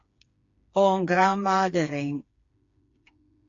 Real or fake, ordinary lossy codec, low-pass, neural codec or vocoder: fake; AAC, 48 kbps; 7.2 kHz; codec, 16 kHz, 4 kbps, FreqCodec, smaller model